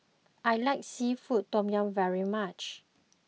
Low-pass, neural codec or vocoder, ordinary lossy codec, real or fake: none; none; none; real